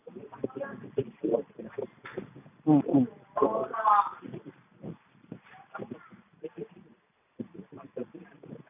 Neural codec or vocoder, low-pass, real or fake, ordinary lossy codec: none; 3.6 kHz; real; none